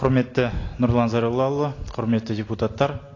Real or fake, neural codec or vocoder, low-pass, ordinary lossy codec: real; none; 7.2 kHz; AAC, 32 kbps